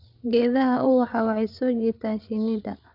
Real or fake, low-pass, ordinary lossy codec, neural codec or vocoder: fake; 5.4 kHz; none; codec, 16 kHz, 8 kbps, FreqCodec, larger model